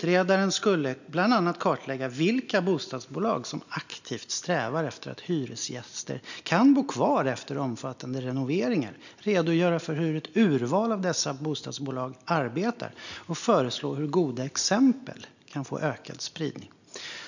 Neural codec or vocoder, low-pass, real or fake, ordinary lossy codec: none; 7.2 kHz; real; none